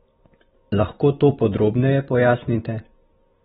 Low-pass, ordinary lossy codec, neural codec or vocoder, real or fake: 14.4 kHz; AAC, 16 kbps; none; real